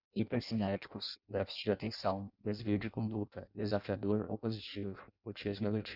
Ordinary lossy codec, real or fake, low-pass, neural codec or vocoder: Opus, 64 kbps; fake; 5.4 kHz; codec, 16 kHz in and 24 kHz out, 0.6 kbps, FireRedTTS-2 codec